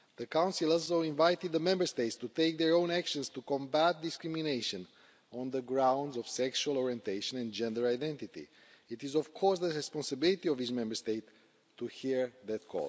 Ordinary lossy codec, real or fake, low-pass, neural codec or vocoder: none; real; none; none